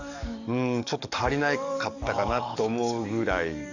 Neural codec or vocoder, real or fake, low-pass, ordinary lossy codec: codec, 44.1 kHz, 7.8 kbps, DAC; fake; 7.2 kHz; none